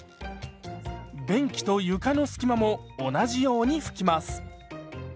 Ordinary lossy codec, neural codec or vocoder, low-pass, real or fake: none; none; none; real